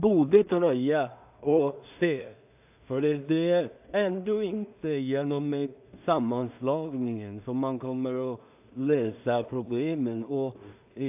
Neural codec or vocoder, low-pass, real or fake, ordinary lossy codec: codec, 16 kHz in and 24 kHz out, 0.4 kbps, LongCat-Audio-Codec, two codebook decoder; 3.6 kHz; fake; AAC, 32 kbps